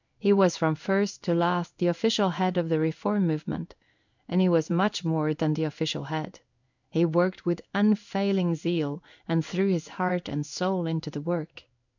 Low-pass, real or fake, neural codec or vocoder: 7.2 kHz; fake; codec, 16 kHz in and 24 kHz out, 1 kbps, XY-Tokenizer